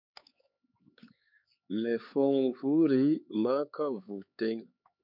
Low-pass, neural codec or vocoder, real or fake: 5.4 kHz; codec, 16 kHz, 4 kbps, X-Codec, HuBERT features, trained on LibriSpeech; fake